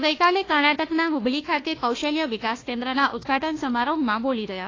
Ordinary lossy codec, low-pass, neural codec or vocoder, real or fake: AAC, 32 kbps; 7.2 kHz; codec, 16 kHz, 1 kbps, FunCodec, trained on Chinese and English, 50 frames a second; fake